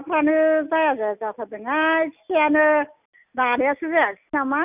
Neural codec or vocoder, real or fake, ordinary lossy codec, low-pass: none; real; none; 3.6 kHz